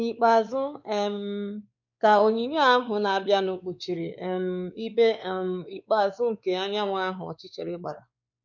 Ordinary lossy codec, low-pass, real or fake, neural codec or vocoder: none; 7.2 kHz; fake; codec, 16 kHz, 4 kbps, X-Codec, WavLM features, trained on Multilingual LibriSpeech